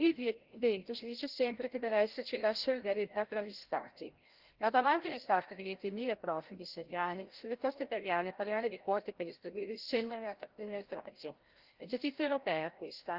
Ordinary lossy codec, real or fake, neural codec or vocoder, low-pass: Opus, 16 kbps; fake; codec, 16 kHz, 0.5 kbps, FreqCodec, larger model; 5.4 kHz